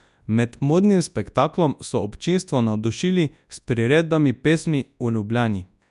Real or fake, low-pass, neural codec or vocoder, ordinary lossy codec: fake; 10.8 kHz; codec, 24 kHz, 0.9 kbps, WavTokenizer, large speech release; none